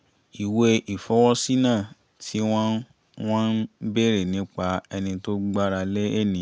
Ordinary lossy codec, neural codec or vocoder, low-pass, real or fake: none; none; none; real